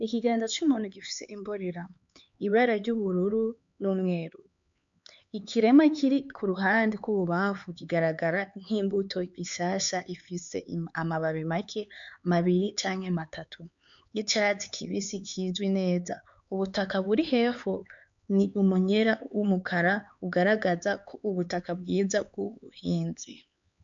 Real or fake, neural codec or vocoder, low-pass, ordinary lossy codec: fake; codec, 16 kHz, 4 kbps, X-Codec, HuBERT features, trained on LibriSpeech; 7.2 kHz; MP3, 64 kbps